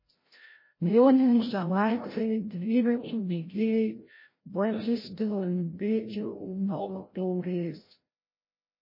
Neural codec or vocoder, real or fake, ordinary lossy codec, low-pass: codec, 16 kHz, 0.5 kbps, FreqCodec, larger model; fake; MP3, 24 kbps; 5.4 kHz